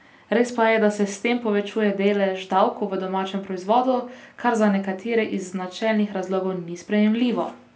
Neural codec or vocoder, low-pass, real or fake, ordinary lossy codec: none; none; real; none